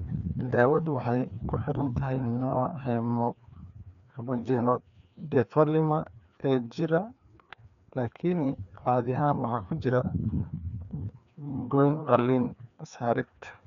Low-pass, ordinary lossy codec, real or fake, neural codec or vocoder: 7.2 kHz; none; fake; codec, 16 kHz, 2 kbps, FreqCodec, larger model